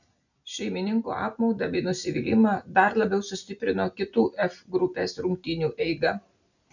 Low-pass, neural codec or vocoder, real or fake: 7.2 kHz; none; real